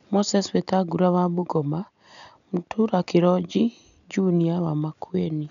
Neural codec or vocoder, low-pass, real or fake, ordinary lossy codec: none; 7.2 kHz; real; none